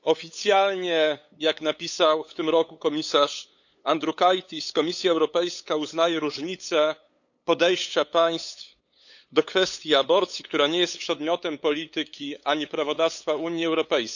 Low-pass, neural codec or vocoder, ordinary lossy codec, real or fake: 7.2 kHz; codec, 16 kHz, 8 kbps, FunCodec, trained on LibriTTS, 25 frames a second; none; fake